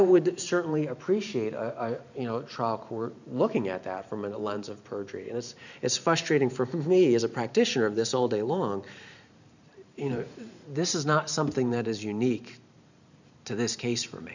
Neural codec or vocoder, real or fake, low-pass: vocoder, 44.1 kHz, 128 mel bands every 512 samples, BigVGAN v2; fake; 7.2 kHz